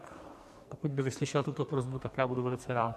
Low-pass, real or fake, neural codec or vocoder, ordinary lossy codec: 14.4 kHz; fake; codec, 44.1 kHz, 2.6 kbps, SNAC; MP3, 64 kbps